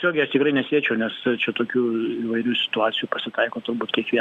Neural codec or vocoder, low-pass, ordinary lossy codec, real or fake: none; 14.4 kHz; Opus, 64 kbps; real